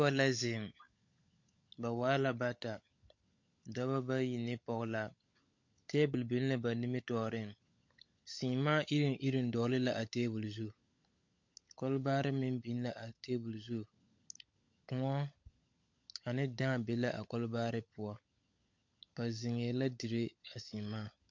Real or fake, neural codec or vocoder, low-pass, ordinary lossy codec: fake; codec, 16 kHz, 16 kbps, FunCodec, trained on LibriTTS, 50 frames a second; 7.2 kHz; MP3, 48 kbps